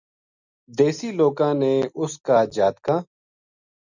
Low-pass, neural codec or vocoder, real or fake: 7.2 kHz; none; real